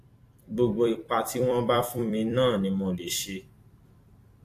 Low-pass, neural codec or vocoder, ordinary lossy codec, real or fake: 14.4 kHz; vocoder, 44.1 kHz, 128 mel bands every 256 samples, BigVGAN v2; AAC, 64 kbps; fake